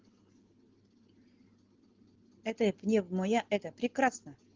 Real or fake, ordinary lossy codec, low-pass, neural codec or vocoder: real; Opus, 16 kbps; 7.2 kHz; none